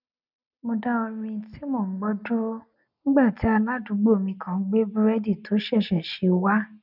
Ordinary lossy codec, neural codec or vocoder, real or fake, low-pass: none; none; real; 5.4 kHz